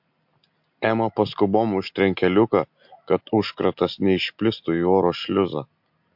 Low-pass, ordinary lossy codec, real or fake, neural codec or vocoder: 5.4 kHz; MP3, 48 kbps; real; none